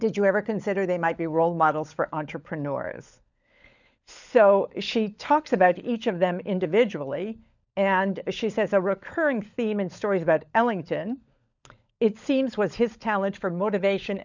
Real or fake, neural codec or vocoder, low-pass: fake; codec, 16 kHz, 16 kbps, FunCodec, trained on LibriTTS, 50 frames a second; 7.2 kHz